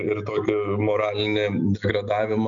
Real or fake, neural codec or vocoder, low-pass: real; none; 7.2 kHz